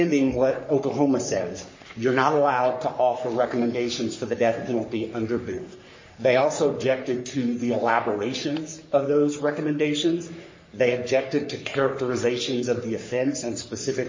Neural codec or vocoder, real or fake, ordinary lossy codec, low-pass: codec, 44.1 kHz, 3.4 kbps, Pupu-Codec; fake; MP3, 32 kbps; 7.2 kHz